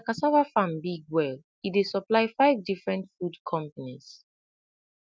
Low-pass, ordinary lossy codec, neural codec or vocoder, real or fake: none; none; none; real